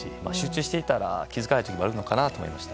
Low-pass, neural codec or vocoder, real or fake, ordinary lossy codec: none; none; real; none